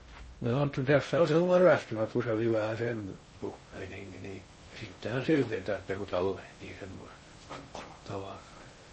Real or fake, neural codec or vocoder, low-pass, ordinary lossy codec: fake; codec, 16 kHz in and 24 kHz out, 0.6 kbps, FocalCodec, streaming, 2048 codes; 10.8 kHz; MP3, 32 kbps